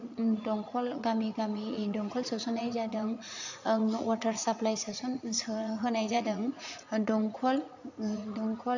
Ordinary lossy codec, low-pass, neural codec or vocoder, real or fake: none; 7.2 kHz; vocoder, 44.1 kHz, 80 mel bands, Vocos; fake